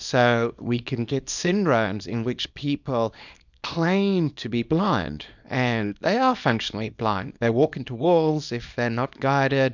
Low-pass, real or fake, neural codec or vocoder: 7.2 kHz; fake; codec, 24 kHz, 0.9 kbps, WavTokenizer, small release